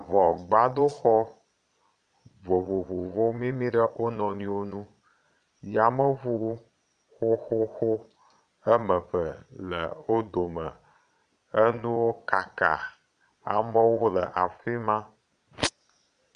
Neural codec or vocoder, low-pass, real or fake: vocoder, 22.05 kHz, 80 mel bands, Vocos; 9.9 kHz; fake